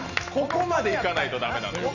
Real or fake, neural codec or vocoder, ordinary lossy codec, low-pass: real; none; none; 7.2 kHz